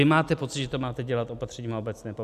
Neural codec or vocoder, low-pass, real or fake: none; 14.4 kHz; real